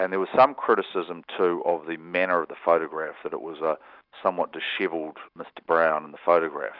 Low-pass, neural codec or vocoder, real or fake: 5.4 kHz; none; real